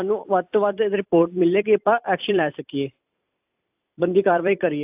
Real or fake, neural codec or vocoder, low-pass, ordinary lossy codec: fake; vocoder, 44.1 kHz, 128 mel bands every 256 samples, BigVGAN v2; 3.6 kHz; AAC, 32 kbps